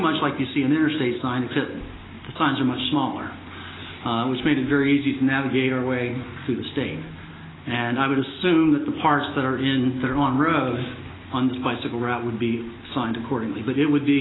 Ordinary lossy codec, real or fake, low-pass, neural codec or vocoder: AAC, 16 kbps; real; 7.2 kHz; none